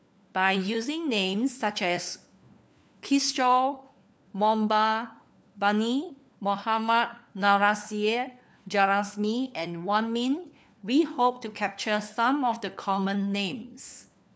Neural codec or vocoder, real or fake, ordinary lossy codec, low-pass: codec, 16 kHz, 4 kbps, FunCodec, trained on LibriTTS, 50 frames a second; fake; none; none